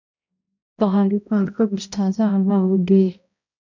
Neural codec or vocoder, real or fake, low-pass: codec, 16 kHz, 0.5 kbps, X-Codec, HuBERT features, trained on balanced general audio; fake; 7.2 kHz